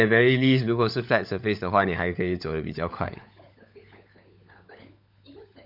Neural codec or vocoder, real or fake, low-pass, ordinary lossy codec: codec, 16 kHz, 16 kbps, FunCodec, trained on LibriTTS, 50 frames a second; fake; 5.4 kHz; none